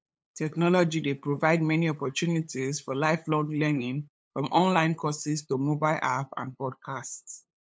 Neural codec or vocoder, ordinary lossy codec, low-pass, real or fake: codec, 16 kHz, 8 kbps, FunCodec, trained on LibriTTS, 25 frames a second; none; none; fake